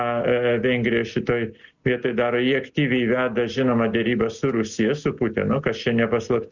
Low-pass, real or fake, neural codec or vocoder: 7.2 kHz; real; none